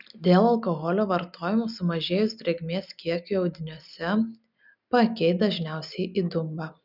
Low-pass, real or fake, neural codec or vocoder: 5.4 kHz; real; none